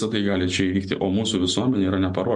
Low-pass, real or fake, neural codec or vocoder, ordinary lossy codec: 10.8 kHz; real; none; MP3, 64 kbps